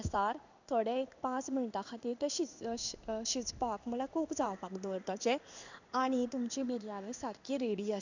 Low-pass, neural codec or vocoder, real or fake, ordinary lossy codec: 7.2 kHz; codec, 16 kHz in and 24 kHz out, 1 kbps, XY-Tokenizer; fake; none